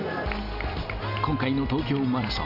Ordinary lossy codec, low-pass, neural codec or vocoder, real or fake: Opus, 64 kbps; 5.4 kHz; none; real